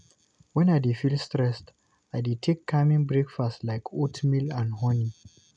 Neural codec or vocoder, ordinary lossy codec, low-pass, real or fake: none; none; 9.9 kHz; real